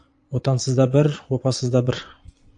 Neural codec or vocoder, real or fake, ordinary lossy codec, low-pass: vocoder, 22.05 kHz, 80 mel bands, Vocos; fake; AAC, 64 kbps; 9.9 kHz